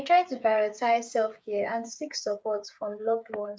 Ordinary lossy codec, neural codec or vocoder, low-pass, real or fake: none; codec, 16 kHz, 8 kbps, FreqCodec, smaller model; none; fake